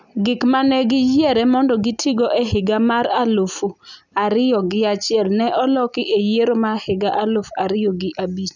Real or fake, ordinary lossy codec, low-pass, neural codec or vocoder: real; none; 7.2 kHz; none